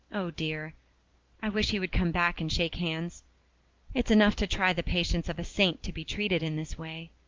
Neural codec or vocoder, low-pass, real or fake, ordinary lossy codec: none; 7.2 kHz; real; Opus, 32 kbps